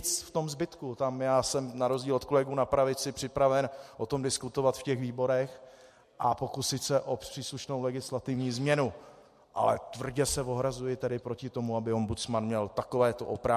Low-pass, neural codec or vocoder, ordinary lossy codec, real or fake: 14.4 kHz; none; MP3, 64 kbps; real